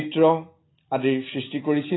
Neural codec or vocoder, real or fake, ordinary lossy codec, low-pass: none; real; AAC, 16 kbps; 7.2 kHz